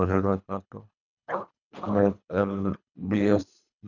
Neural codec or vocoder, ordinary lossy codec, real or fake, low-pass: codec, 24 kHz, 3 kbps, HILCodec; none; fake; 7.2 kHz